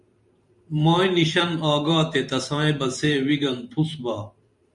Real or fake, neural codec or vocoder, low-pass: fake; vocoder, 44.1 kHz, 128 mel bands every 512 samples, BigVGAN v2; 10.8 kHz